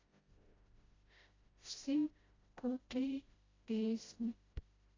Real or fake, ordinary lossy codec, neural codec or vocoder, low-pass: fake; AAC, 32 kbps; codec, 16 kHz, 0.5 kbps, FreqCodec, smaller model; 7.2 kHz